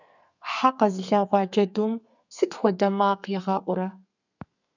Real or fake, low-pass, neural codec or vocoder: fake; 7.2 kHz; codec, 32 kHz, 1.9 kbps, SNAC